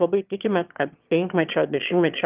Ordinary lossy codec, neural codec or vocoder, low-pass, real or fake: Opus, 24 kbps; autoencoder, 22.05 kHz, a latent of 192 numbers a frame, VITS, trained on one speaker; 3.6 kHz; fake